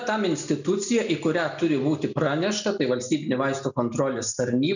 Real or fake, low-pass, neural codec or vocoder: real; 7.2 kHz; none